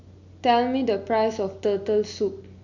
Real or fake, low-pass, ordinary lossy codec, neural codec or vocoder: real; 7.2 kHz; none; none